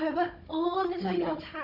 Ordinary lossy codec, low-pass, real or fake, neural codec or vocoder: none; 5.4 kHz; fake; codec, 16 kHz, 16 kbps, FunCodec, trained on Chinese and English, 50 frames a second